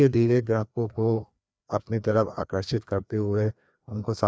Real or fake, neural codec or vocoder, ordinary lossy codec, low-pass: fake; codec, 16 kHz, 2 kbps, FreqCodec, larger model; none; none